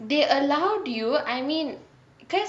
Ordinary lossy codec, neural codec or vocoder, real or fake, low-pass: none; none; real; none